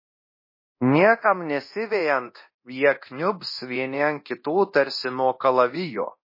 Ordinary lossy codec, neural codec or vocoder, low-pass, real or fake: MP3, 24 kbps; codec, 24 kHz, 0.9 kbps, DualCodec; 5.4 kHz; fake